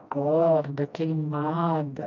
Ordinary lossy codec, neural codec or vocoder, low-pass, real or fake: none; codec, 16 kHz, 1 kbps, FreqCodec, smaller model; 7.2 kHz; fake